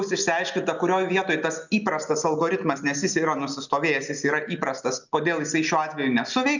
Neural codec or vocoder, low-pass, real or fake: none; 7.2 kHz; real